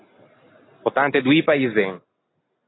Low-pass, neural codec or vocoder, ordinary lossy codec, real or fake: 7.2 kHz; none; AAC, 16 kbps; real